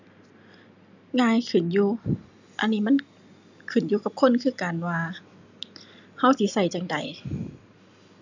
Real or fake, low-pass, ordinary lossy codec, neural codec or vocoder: real; 7.2 kHz; none; none